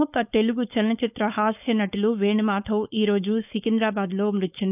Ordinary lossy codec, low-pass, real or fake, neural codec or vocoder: none; 3.6 kHz; fake; codec, 16 kHz, 4.8 kbps, FACodec